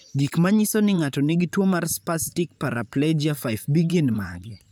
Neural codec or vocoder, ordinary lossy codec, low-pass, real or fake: vocoder, 44.1 kHz, 128 mel bands, Pupu-Vocoder; none; none; fake